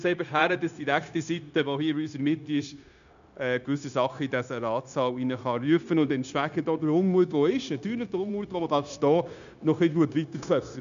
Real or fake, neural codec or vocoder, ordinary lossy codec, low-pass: fake; codec, 16 kHz, 0.9 kbps, LongCat-Audio-Codec; none; 7.2 kHz